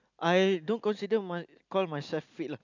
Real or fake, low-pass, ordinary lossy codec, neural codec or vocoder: real; 7.2 kHz; none; none